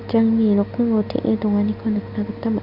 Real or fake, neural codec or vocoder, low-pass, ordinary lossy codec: real; none; 5.4 kHz; none